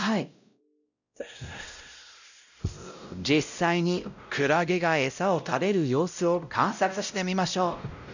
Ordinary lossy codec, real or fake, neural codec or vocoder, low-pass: none; fake; codec, 16 kHz, 0.5 kbps, X-Codec, WavLM features, trained on Multilingual LibriSpeech; 7.2 kHz